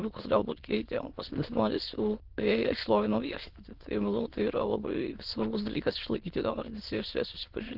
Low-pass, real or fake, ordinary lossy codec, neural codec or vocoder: 5.4 kHz; fake; Opus, 16 kbps; autoencoder, 22.05 kHz, a latent of 192 numbers a frame, VITS, trained on many speakers